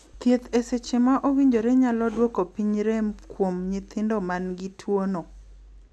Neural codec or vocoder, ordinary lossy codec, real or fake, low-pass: none; none; real; none